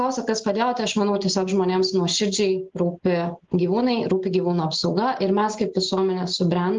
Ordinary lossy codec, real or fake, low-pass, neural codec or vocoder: Opus, 24 kbps; real; 7.2 kHz; none